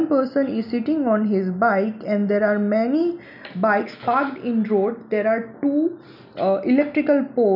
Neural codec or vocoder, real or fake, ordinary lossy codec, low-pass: none; real; none; 5.4 kHz